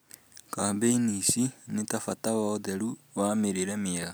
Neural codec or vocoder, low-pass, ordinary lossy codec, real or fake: none; none; none; real